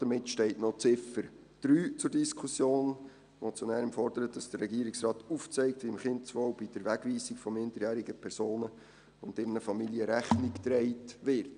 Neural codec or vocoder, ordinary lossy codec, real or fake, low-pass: none; none; real; 9.9 kHz